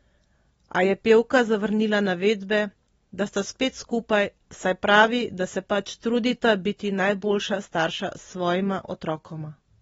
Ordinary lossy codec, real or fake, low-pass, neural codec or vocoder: AAC, 24 kbps; real; 19.8 kHz; none